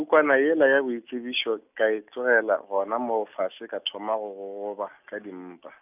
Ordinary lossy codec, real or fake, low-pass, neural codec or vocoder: none; real; 3.6 kHz; none